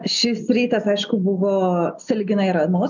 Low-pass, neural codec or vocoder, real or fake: 7.2 kHz; none; real